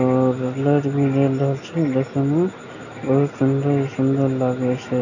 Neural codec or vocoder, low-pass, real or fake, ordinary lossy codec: none; 7.2 kHz; real; none